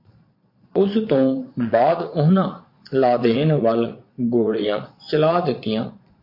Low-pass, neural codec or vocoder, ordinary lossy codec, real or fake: 5.4 kHz; vocoder, 22.05 kHz, 80 mel bands, Vocos; MP3, 32 kbps; fake